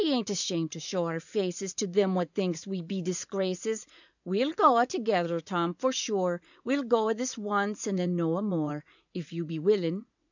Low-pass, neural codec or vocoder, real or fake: 7.2 kHz; vocoder, 44.1 kHz, 80 mel bands, Vocos; fake